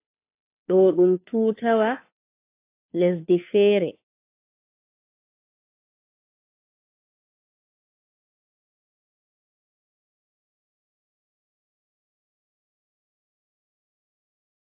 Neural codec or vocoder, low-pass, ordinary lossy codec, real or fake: codec, 16 kHz, 2 kbps, FunCodec, trained on Chinese and English, 25 frames a second; 3.6 kHz; AAC, 24 kbps; fake